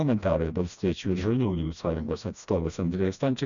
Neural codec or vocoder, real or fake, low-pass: codec, 16 kHz, 1 kbps, FreqCodec, smaller model; fake; 7.2 kHz